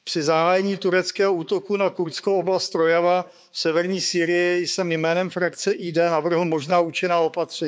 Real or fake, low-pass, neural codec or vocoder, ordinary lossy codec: fake; none; codec, 16 kHz, 4 kbps, X-Codec, HuBERT features, trained on balanced general audio; none